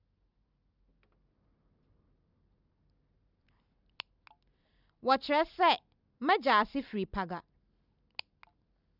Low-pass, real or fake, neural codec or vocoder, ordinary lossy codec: 5.4 kHz; real; none; none